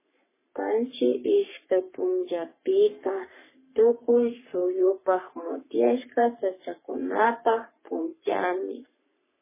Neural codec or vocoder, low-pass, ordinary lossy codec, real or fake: codec, 44.1 kHz, 3.4 kbps, Pupu-Codec; 3.6 kHz; MP3, 16 kbps; fake